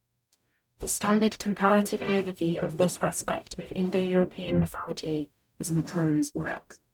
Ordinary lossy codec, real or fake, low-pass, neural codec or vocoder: none; fake; 19.8 kHz; codec, 44.1 kHz, 0.9 kbps, DAC